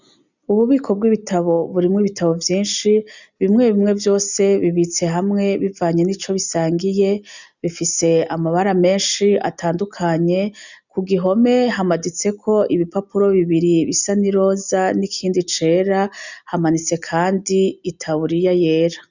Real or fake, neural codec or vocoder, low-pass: real; none; 7.2 kHz